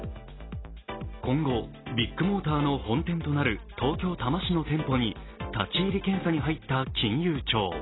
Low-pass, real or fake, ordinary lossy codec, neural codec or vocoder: 7.2 kHz; real; AAC, 16 kbps; none